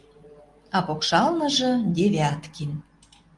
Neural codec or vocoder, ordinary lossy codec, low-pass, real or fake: none; Opus, 24 kbps; 10.8 kHz; real